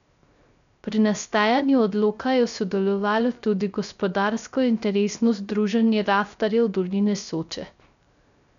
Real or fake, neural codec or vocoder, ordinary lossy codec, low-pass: fake; codec, 16 kHz, 0.3 kbps, FocalCodec; none; 7.2 kHz